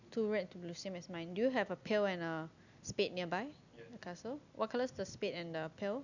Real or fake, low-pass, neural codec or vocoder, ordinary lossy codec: real; 7.2 kHz; none; none